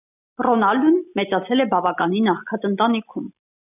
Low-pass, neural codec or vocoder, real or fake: 3.6 kHz; none; real